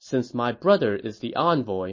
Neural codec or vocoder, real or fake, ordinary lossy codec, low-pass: none; real; MP3, 32 kbps; 7.2 kHz